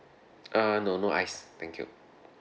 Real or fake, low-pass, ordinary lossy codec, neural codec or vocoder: real; none; none; none